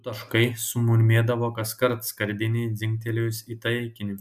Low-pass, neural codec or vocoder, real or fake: 14.4 kHz; none; real